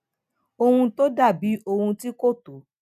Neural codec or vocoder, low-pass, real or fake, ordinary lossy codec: none; 14.4 kHz; real; none